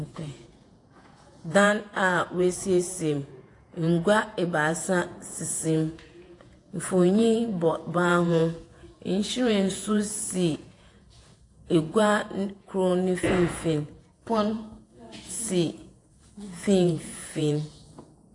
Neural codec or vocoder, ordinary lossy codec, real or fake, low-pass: vocoder, 48 kHz, 128 mel bands, Vocos; AAC, 48 kbps; fake; 10.8 kHz